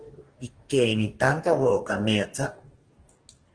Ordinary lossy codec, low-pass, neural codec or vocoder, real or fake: Opus, 16 kbps; 9.9 kHz; codec, 44.1 kHz, 2.6 kbps, DAC; fake